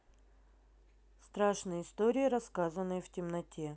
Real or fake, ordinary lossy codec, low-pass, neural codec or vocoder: real; none; none; none